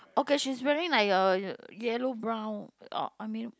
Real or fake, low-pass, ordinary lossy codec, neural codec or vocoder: real; none; none; none